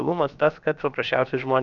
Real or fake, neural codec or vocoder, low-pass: fake; codec, 16 kHz, about 1 kbps, DyCAST, with the encoder's durations; 7.2 kHz